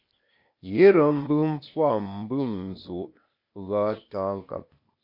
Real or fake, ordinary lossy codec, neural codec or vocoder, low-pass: fake; MP3, 32 kbps; codec, 16 kHz, 0.8 kbps, ZipCodec; 5.4 kHz